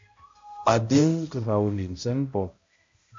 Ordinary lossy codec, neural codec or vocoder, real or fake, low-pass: MP3, 48 kbps; codec, 16 kHz, 0.5 kbps, X-Codec, HuBERT features, trained on balanced general audio; fake; 7.2 kHz